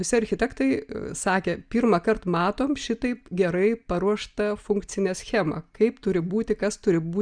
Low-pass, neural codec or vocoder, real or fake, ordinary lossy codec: 9.9 kHz; none; real; Opus, 64 kbps